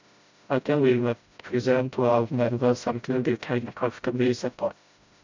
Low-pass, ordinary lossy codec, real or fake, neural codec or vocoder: 7.2 kHz; MP3, 64 kbps; fake; codec, 16 kHz, 0.5 kbps, FreqCodec, smaller model